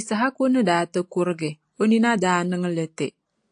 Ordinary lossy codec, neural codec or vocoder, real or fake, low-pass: AAC, 64 kbps; none; real; 9.9 kHz